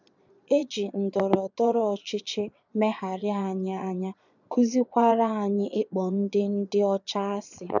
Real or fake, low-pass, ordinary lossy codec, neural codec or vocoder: fake; 7.2 kHz; none; vocoder, 22.05 kHz, 80 mel bands, Vocos